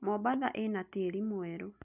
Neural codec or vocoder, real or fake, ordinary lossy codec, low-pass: none; real; none; 3.6 kHz